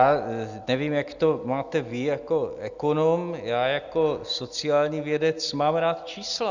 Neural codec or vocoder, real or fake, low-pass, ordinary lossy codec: none; real; 7.2 kHz; Opus, 64 kbps